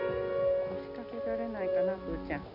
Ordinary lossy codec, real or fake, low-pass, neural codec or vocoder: none; real; 5.4 kHz; none